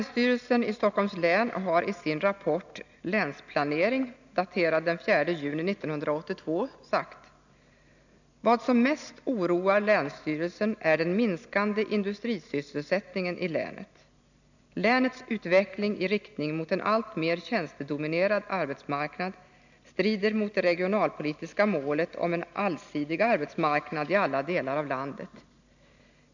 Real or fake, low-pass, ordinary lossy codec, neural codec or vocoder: real; 7.2 kHz; none; none